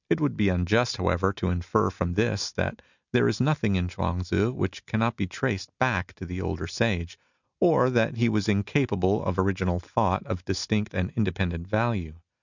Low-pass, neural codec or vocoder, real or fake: 7.2 kHz; none; real